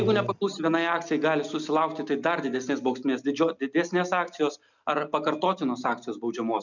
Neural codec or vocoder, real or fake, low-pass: none; real; 7.2 kHz